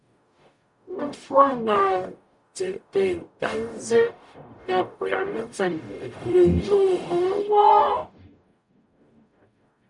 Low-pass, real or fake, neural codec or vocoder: 10.8 kHz; fake; codec, 44.1 kHz, 0.9 kbps, DAC